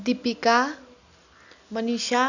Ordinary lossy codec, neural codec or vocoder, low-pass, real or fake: none; none; 7.2 kHz; real